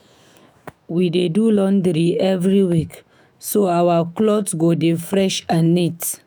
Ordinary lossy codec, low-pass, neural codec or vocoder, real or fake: none; none; autoencoder, 48 kHz, 128 numbers a frame, DAC-VAE, trained on Japanese speech; fake